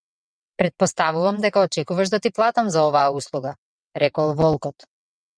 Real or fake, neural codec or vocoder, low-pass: fake; vocoder, 22.05 kHz, 80 mel bands, WaveNeXt; 9.9 kHz